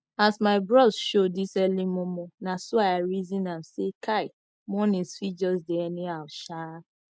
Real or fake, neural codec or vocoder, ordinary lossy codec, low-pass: real; none; none; none